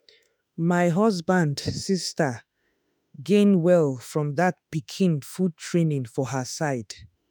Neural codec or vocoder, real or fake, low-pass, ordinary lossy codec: autoencoder, 48 kHz, 32 numbers a frame, DAC-VAE, trained on Japanese speech; fake; none; none